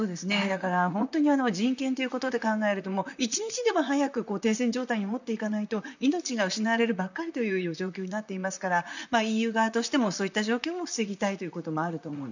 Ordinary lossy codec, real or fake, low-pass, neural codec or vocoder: none; fake; 7.2 kHz; vocoder, 44.1 kHz, 128 mel bands, Pupu-Vocoder